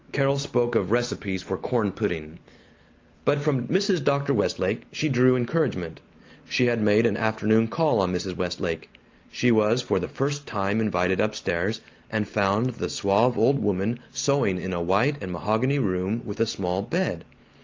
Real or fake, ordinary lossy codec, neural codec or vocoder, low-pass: real; Opus, 24 kbps; none; 7.2 kHz